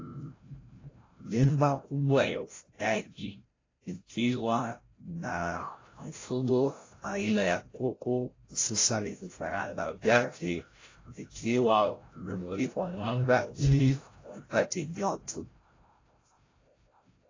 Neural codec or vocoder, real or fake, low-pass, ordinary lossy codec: codec, 16 kHz, 0.5 kbps, FreqCodec, larger model; fake; 7.2 kHz; AAC, 32 kbps